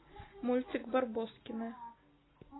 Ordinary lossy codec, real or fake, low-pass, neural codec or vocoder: AAC, 16 kbps; real; 7.2 kHz; none